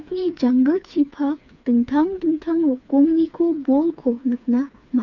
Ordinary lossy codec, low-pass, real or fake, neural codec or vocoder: none; 7.2 kHz; fake; codec, 16 kHz, 4 kbps, FreqCodec, smaller model